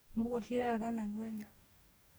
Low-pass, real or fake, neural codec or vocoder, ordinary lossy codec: none; fake; codec, 44.1 kHz, 2.6 kbps, DAC; none